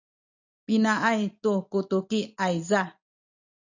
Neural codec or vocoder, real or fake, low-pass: none; real; 7.2 kHz